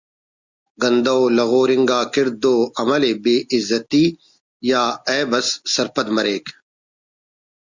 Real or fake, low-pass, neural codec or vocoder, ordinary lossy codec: real; 7.2 kHz; none; Opus, 64 kbps